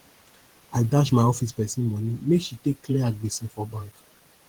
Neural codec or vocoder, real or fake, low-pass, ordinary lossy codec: vocoder, 48 kHz, 128 mel bands, Vocos; fake; 19.8 kHz; Opus, 16 kbps